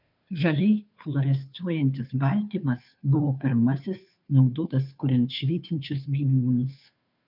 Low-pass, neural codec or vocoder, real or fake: 5.4 kHz; codec, 16 kHz, 2 kbps, FunCodec, trained on Chinese and English, 25 frames a second; fake